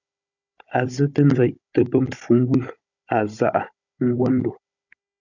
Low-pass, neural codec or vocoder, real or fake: 7.2 kHz; codec, 16 kHz, 16 kbps, FunCodec, trained on Chinese and English, 50 frames a second; fake